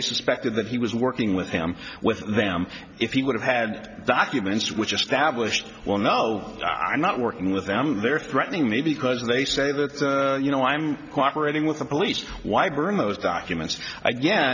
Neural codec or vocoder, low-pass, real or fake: none; 7.2 kHz; real